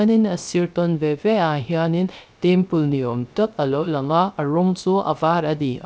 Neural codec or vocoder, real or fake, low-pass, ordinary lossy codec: codec, 16 kHz, 0.3 kbps, FocalCodec; fake; none; none